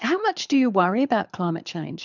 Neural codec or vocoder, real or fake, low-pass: codec, 24 kHz, 6 kbps, HILCodec; fake; 7.2 kHz